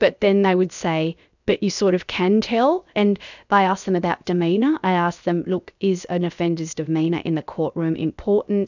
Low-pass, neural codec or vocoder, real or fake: 7.2 kHz; codec, 16 kHz, about 1 kbps, DyCAST, with the encoder's durations; fake